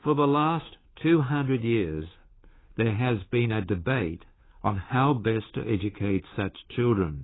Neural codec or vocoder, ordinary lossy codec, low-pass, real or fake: codec, 16 kHz, 2 kbps, FunCodec, trained on Chinese and English, 25 frames a second; AAC, 16 kbps; 7.2 kHz; fake